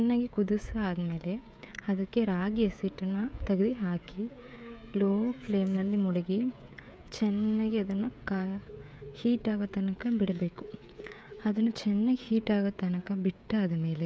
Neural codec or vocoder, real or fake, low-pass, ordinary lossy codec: codec, 16 kHz, 16 kbps, FreqCodec, smaller model; fake; none; none